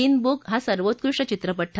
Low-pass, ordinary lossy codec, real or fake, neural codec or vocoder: none; none; real; none